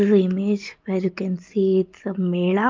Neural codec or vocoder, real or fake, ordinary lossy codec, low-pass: none; real; Opus, 32 kbps; 7.2 kHz